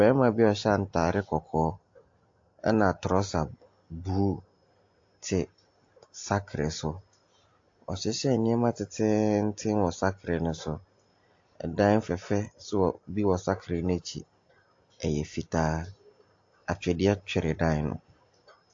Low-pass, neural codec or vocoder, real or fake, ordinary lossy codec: 7.2 kHz; none; real; AAC, 48 kbps